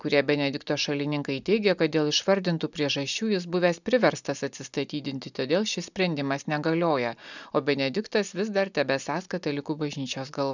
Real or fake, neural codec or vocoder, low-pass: real; none; 7.2 kHz